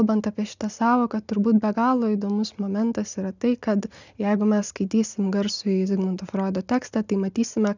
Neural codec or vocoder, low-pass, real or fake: none; 7.2 kHz; real